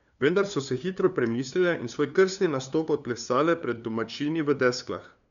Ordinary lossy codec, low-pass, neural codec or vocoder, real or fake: none; 7.2 kHz; codec, 16 kHz, 2 kbps, FunCodec, trained on LibriTTS, 25 frames a second; fake